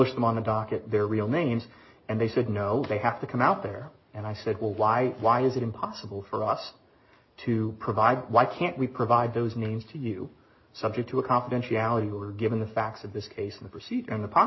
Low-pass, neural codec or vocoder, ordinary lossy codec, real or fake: 7.2 kHz; none; MP3, 24 kbps; real